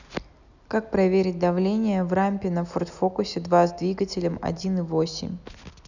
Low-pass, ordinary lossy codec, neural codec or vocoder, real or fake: 7.2 kHz; none; none; real